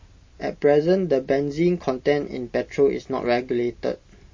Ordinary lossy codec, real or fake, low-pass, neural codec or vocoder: MP3, 32 kbps; real; 7.2 kHz; none